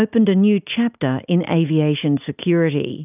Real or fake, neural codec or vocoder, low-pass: real; none; 3.6 kHz